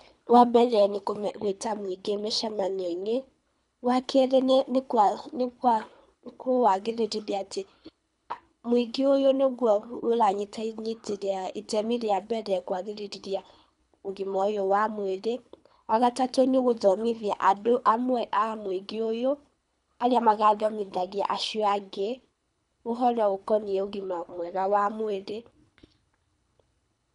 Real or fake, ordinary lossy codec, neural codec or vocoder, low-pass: fake; none; codec, 24 kHz, 3 kbps, HILCodec; 10.8 kHz